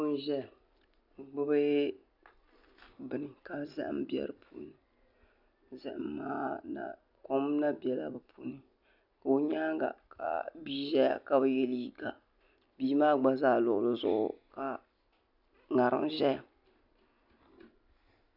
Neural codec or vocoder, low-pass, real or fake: none; 5.4 kHz; real